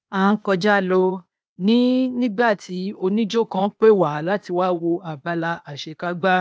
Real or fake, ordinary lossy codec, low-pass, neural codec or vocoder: fake; none; none; codec, 16 kHz, 0.8 kbps, ZipCodec